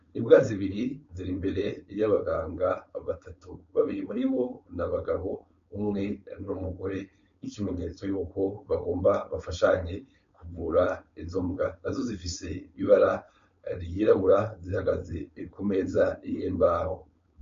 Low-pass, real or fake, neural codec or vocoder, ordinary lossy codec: 7.2 kHz; fake; codec, 16 kHz, 4.8 kbps, FACodec; MP3, 48 kbps